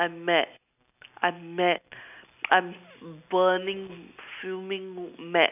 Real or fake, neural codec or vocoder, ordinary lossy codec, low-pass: real; none; none; 3.6 kHz